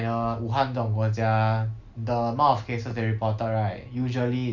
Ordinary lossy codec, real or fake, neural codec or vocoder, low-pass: none; real; none; 7.2 kHz